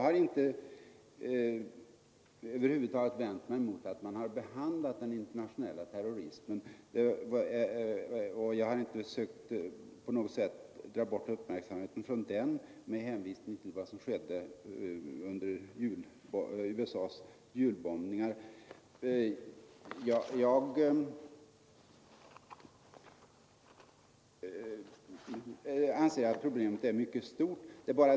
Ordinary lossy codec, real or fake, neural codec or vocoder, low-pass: none; real; none; none